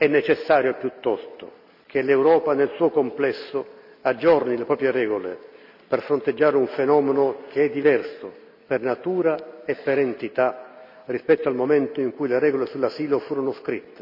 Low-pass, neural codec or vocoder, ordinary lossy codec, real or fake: 5.4 kHz; none; none; real